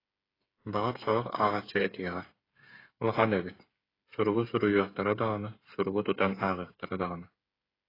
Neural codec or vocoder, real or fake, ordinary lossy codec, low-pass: codec, 16 kHz, 8 kbps, FreqCodec, smaller model; fake; AAC, 24 kbps; 5.4 kHz